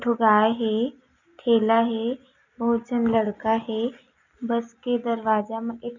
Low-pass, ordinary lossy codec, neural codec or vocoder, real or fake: 7.2 kHz; none; none; real